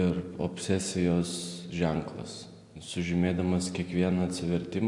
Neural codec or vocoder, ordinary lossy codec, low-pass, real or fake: none; AAC, 64 kbps; 10.8 kHz; real